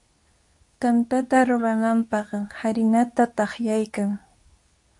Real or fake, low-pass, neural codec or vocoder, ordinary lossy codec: fake; 10.8 kHz; codec, 24 kHz, 0.9 kbps, WavTokenizer, medium speech release version 2; AAC, 64 kbps